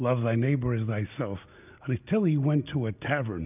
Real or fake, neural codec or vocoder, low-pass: real; none; 3.6 kHz